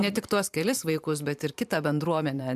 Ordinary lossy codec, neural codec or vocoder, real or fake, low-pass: AAC, 96 kbps; vocoder, 44.1 kHz, 128 mel bands every 512 samples, BigVGAN v2; fake; 14.4 kHz